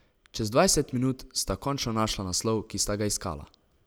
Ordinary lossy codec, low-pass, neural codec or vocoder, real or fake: none; none; none; real